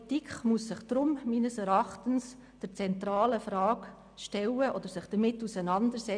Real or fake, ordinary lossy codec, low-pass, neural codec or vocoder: real; none; 9.9 kHz; none